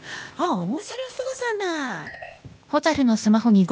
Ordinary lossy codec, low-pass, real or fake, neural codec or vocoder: none; none; fake; codec, 16 kHz, 0.8 kbps, ZipCodec